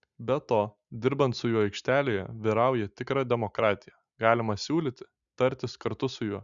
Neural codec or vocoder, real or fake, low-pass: none; real; 7.2 kHz